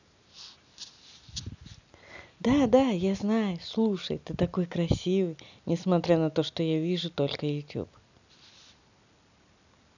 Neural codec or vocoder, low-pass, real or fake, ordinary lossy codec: none; 7.2 kHz; real; none